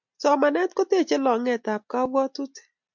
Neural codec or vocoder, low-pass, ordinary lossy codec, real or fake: none; 7.2 kHz; MP3, 64 kbps; real